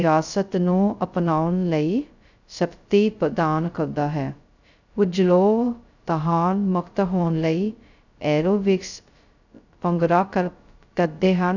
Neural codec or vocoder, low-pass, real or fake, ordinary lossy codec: codec, 16 kHz, 0.2 kbps, FocalCodec; 7.2 kHz; fake; none